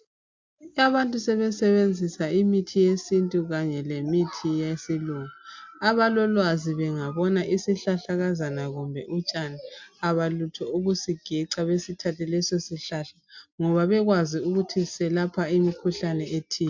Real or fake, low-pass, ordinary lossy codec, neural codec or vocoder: real; 7.2 kHz; MP3, 64 kbps; none